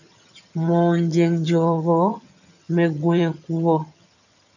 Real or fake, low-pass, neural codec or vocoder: fake; 7.2 kHz; vocoder, 22.05 kHz, 80 mel bands, HiFi-GAN